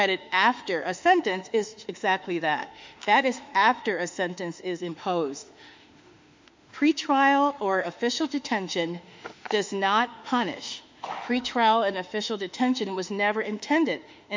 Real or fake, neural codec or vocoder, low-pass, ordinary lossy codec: fake; autoencoder, 48 kHz, 32 numbers a frame, DAC-VAE, trained on Japanese speech; 7.2 kHz; MP3, 64 kbps